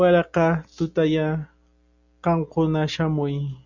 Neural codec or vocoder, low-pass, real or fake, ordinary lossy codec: none; 7.2 kHz; real; Opus, 64 kbps